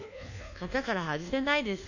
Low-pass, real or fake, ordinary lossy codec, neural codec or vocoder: 7.2 kHz; fake; none; codec, 24 kHz, 1.2 kbps, DualCodec